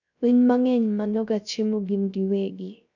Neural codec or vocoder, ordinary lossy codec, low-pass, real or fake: codec, 16 kHz, 0.3 kbps, FocalCodec; none; 7.2 kHz; fake